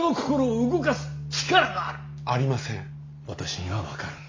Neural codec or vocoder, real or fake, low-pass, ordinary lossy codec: none; real; 7.2 kHz; MP3, 48 kbps